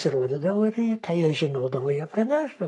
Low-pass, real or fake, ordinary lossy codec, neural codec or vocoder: 10.8 kHz; fake; AAC, 64 kbps; codec, 44.1 kHz, 3.4 kbps, Pupu-Codec